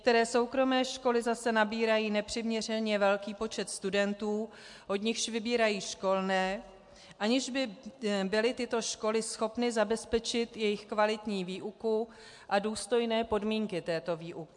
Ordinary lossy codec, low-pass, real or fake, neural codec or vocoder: MP3, 64 kbps; 10.8 kHz; real; none